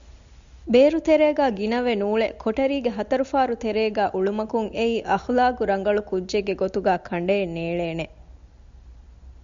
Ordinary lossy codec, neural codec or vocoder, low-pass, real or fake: Opus, 64 kbps; none; 7.2 kHz; real